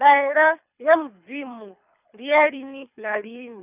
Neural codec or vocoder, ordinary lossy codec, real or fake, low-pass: codec, 24 kHz, 3 kbps, HILCodec; none; fake; 3.6 kHz